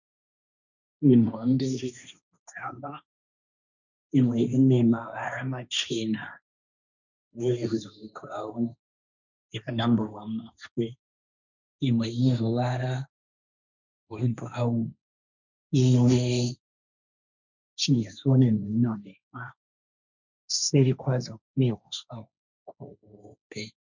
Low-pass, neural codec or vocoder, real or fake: 7.2 kHz; codec, 16 kHz, 1.1 kbps, Voila-Tokenizer; fake